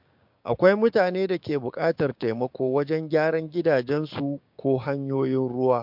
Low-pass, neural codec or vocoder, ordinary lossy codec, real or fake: 5.4 kHz; codec, 16 kHz, 6 kbps, DAC; MP3, 48 kbps; fake